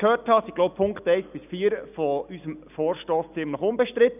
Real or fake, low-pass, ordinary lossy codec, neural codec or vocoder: real; 3.6 kHz; none; none